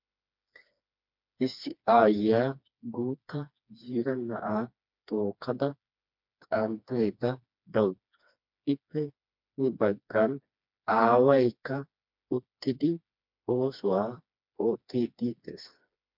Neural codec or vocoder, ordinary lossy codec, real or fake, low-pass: codec, 16 kHz, 2 kbps, FreqCodec, smaller model; MP3, 48 kbps; fake; 5.4 kHz